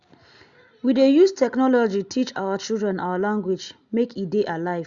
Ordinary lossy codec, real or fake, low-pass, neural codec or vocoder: Opus, 64 kbps; real; 7.2 kHz; none